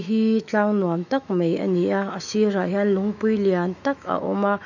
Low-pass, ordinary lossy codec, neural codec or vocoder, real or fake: 7.2 kHz; none; autoencoder, 48 kHz, 128 numbers a frame, DAC-VAE, trained on Japanese speech; fake